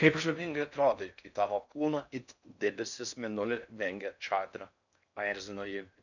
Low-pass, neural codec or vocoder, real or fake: 7.2 kHz; codec, 16 kHz in and 24 kHz out, 0.6 kbps, FocalCodec, streaming, 4096 codes; fake